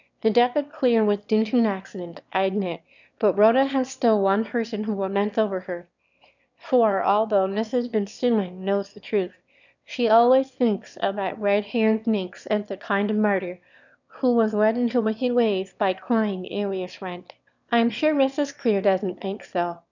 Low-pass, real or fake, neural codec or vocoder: 7.2 kHz; fake; autoencoder, 22.05 kHz, a latent of 192 numbers a frame, VITS, trained on one speaker